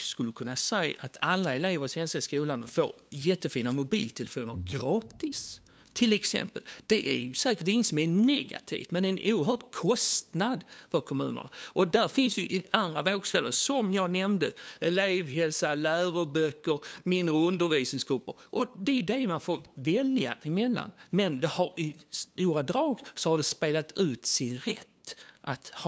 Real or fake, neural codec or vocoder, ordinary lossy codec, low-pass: fake; codec, 16 kHz, 2 kbps, FunCodec, trained on LibriTTS, 25 frames a second; none; none